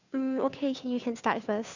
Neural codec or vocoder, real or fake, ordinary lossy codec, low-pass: codec, 16 kHz, 2 kbps, FunCodec, trained on Chinese and English, 25 frames a second; fake; none; 7.2 kHz